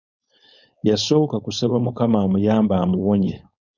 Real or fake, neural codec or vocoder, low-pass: fake; codec, 16 kHz, 4.8 kbps, FACodec; 7.2 kHz